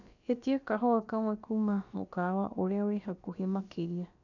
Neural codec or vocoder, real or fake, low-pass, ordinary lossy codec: codec, 16 kHz, about 1 kbps, DyCAST, with the encoder's durations; fake; 7.2 kHz; none